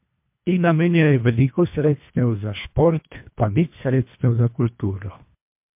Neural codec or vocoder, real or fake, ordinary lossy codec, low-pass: codec, 24 kHz, 1.5 kbps, HILCodec; fake; MP3, 32 kbps; 3.6 kHz